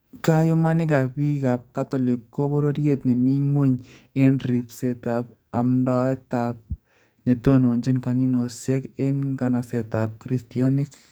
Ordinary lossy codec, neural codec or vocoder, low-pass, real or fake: none; codec, 44.1 kHz, 2.6 kbps, SNAC; none; fake